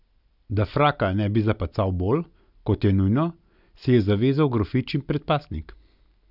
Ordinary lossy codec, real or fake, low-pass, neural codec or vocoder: none; real; 5.4 kHz; none